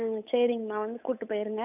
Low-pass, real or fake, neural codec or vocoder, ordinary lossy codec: 3.6 kHz; fake; codec, 16 kHz, 8 kbps, FunCodec, trained on Chinese and English, 25 frames a second; none